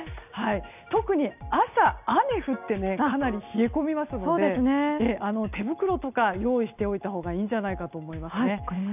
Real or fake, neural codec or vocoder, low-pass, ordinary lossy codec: real; none; 3.6 kHz; none